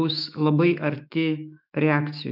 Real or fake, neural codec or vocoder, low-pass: fake; autoencoder, 48 kHz, 128 numbers a frame, DAC-VAE, trained on Japanese speech; 5.4 kHz